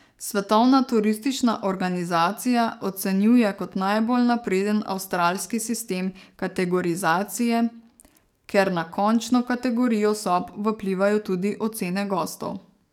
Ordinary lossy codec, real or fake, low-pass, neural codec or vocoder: none; fake; 19.8 kHz; codec, 44.1 kHz, 7.8 kbps, DAC